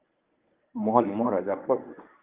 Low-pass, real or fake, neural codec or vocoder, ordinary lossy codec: 3.6 kHz; fake; codec, 24 kHz, 0.9 kbps, WavTokenizer, medium speech release version 1; Opus, 32 kbps